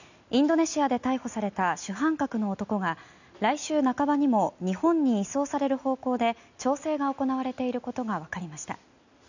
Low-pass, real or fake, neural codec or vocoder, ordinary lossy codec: 7.2 kHz; real; none; none